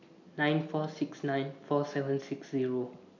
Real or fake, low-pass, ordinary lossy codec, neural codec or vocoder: real; 7.2 kHz; none; none